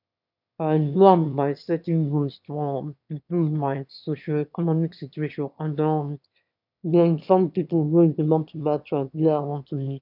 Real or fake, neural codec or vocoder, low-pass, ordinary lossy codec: fake; autoencoder, 22.05 kHz, a latent of 192 numbers a frame, VITS, trained on one speaker; 5.4 kHz; none